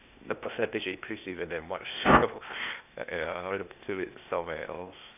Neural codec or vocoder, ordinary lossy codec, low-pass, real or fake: codec, 16 kHz, 0.8 kbps, ZipCodec; none; 3.6 kHz; fake